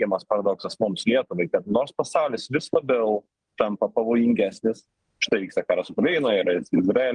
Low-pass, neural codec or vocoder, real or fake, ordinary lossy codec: 9.9 kHz; none; real; Opus, 24 kbps